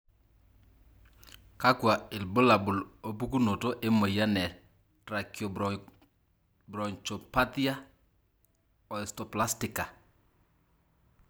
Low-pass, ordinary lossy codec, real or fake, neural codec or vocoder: none; none; real; none